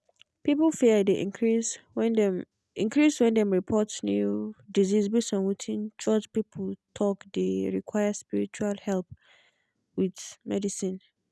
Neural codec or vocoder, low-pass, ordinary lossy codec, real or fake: none; none; none; real